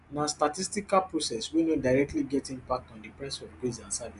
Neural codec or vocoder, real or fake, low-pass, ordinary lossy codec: none; real; 10.8 kHz; AAC, 96 kbps